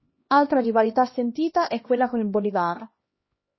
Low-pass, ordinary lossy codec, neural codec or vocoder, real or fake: 7.2 kHz; MP3, 24 kbps; codec, 16 kHz, 1 kbps, X-Codec, HuBERT features, trained on LibriSpeech; fake